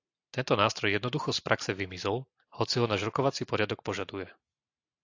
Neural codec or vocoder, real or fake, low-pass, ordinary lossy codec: none; real; 7.2 kHz; AAC, 48 kbps